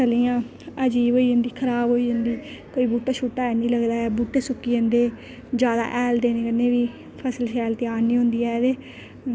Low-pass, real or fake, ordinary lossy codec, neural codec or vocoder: none; real; none; none